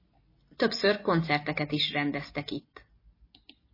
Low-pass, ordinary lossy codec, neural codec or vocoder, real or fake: 5.4 kHz; MP3, 24 kbps; none; real